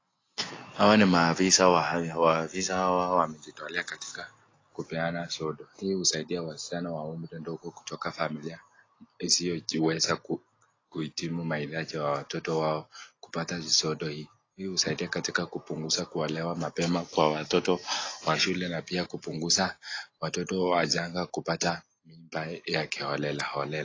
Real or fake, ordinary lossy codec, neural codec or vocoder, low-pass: real; AAC, 32 kbps; none; 7.2 kHz